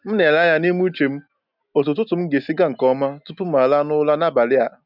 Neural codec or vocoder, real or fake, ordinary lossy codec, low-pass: none; real; none; 5.4 kHz